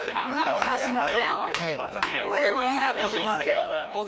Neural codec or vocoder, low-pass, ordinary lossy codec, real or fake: codec, 16 kHz, 1 kbps, FreqCodec, larger model; none; none; fake